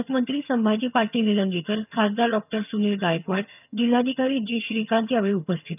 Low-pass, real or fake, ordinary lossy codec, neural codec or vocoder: 3.6 kHz; fake; none; vocoder, 22.05 kHz, 80 mel bands, HiFi-GAN